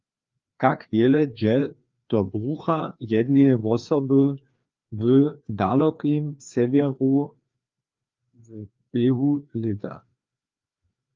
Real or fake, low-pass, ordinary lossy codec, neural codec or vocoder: fake; 7.2 kHz; Opus, 32 kbps; codec, 16 kHz, 2 kbps, FreqCodec, larger model